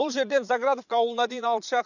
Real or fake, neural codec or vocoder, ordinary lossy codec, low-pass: fake; vocoder, 22.05 kHz, 80 mel bands, Vocos; none; 7.2 kHz